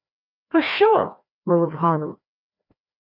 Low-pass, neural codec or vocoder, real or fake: 5.4 kHz; codec, 16 kHz, 1 kbps, FreqCodec, larger model; fake